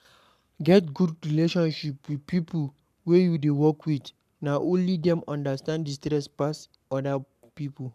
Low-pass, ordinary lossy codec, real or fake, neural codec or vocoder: 14.4 kHz; none; fake; codec, 44.1 kHz, 7.8 kbps, Pupu-Codec